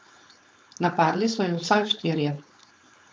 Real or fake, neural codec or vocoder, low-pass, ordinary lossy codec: fake; codec, 16 kHz, 4.8 kbps, FACodec; none; none